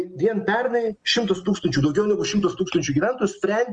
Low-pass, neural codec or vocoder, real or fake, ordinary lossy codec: 9.9 kHz; none; real; Opus, 24 kbps